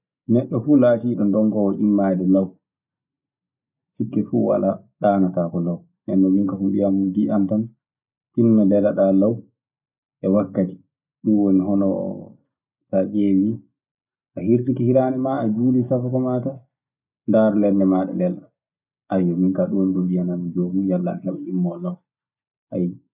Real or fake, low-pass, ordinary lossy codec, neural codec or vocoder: real; 3.6 kHz; none; none